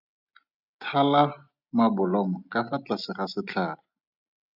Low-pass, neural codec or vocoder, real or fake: 5.4 kHz; none; real